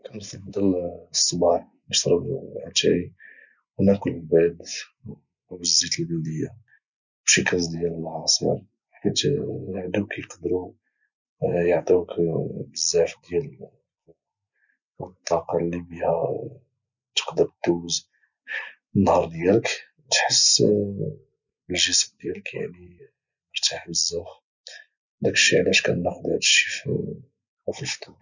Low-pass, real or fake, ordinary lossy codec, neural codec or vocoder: 7.2 kHz; real; none; none